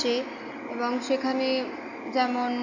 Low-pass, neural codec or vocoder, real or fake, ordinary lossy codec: 7.2 kHz; none; real; none